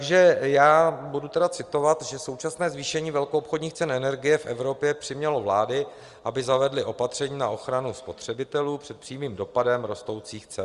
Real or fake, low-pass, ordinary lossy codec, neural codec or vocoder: real; 10.8 kHz; Opus, 32 kbps; none